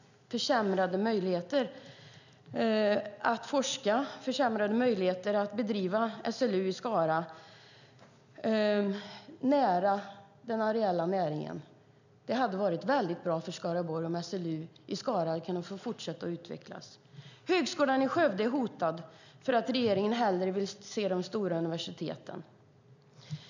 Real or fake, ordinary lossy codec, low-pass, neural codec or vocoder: real; none; 7.2 kHz; none